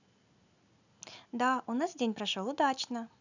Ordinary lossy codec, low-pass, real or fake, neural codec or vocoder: none; 7.2 kHz; real; none